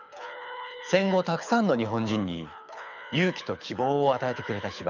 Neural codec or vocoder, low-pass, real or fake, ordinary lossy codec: codec, 24 kHz, 6 kbps, HILCodec; 7.2 kHz; fake; none